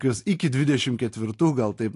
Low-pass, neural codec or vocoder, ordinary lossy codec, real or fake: 10.8 kHz; none; AAC, 48 kbps; real